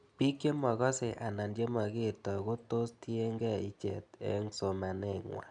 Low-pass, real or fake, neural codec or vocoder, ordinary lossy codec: 9.9 kHz; real; none; none